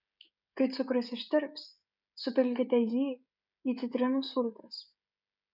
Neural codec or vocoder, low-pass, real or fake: codec, 16 kHz, 16 kbps, FreqCodec, smaller model; 5.4 kHz; fake